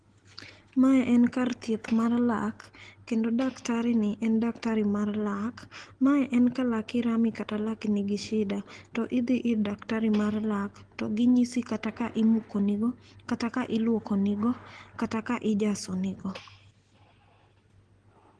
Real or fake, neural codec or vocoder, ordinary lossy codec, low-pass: real; none; Opus, 16 kbps; 9.9 kHz